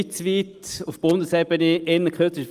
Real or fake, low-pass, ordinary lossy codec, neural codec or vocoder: real; 14.4 kHz; Opus, 32 kbps; none